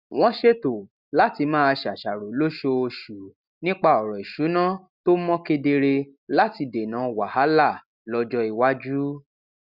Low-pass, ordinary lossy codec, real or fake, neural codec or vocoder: 5.4 kHz; Opus, 64 kbps; real; none